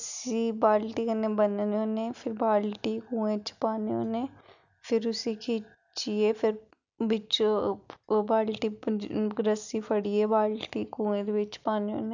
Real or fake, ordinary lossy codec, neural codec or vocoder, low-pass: real; none; none; 7.2 kHz